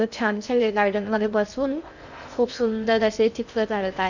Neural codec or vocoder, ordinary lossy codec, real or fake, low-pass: codec, 16 kHz in and 24 kHz out, 0.6 kbps, FocalCodec, streaming, 2048 codes; none; fake; 7.2 kHz